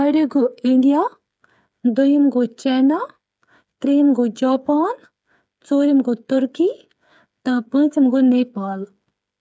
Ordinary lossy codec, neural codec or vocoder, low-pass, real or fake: none; codec, 16 kHz, 8 kbps, FreqCodec, smaller model; none; fake